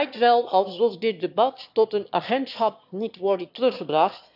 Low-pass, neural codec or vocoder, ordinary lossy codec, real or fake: 5.4 kHz; autoencoder, 22.05 kHz, a latent of 192 numbers a frame, VITS, trained on one speaker; none; fake